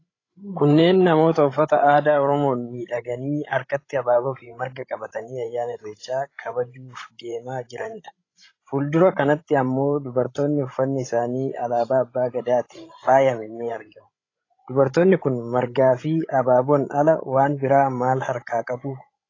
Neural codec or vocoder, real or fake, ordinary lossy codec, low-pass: codec, 16 kHz, 16 kbps, FreqCodec, larger model; fake; AAC, 32 kbps; 7.2 kHz